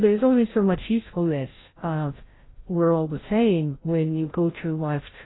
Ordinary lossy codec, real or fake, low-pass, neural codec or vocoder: AAC, 16 kbps; fake; 7.2 kHz; codec, 16 kHz, 0.5 kbps, FreqCodec, larger model